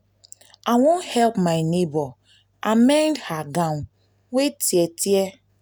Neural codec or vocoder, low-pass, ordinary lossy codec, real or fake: none; none; none; real